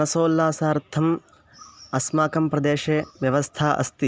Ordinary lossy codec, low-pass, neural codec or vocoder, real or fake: none; none; none; real